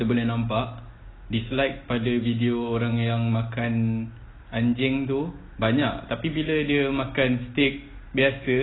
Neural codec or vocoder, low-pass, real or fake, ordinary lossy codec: none; 7.2 kHz; real; AAC, 16 kbps